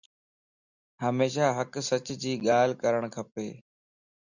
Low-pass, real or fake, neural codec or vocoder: 7.2 kHz; real; none